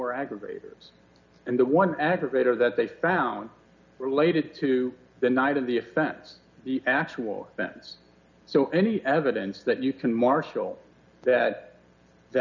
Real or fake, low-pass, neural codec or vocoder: real; 7.2 kHz; none